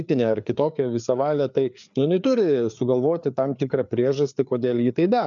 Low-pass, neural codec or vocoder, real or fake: 7.2 kHz; codec, 16 kHz, 4 kbps, FreqCodec, larger model; fake